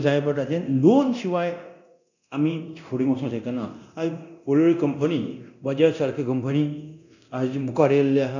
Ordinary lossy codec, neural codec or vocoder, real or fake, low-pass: none; codec, 24 kHz, 0.9 kbps, DualCodec; fake; 7.2 kHz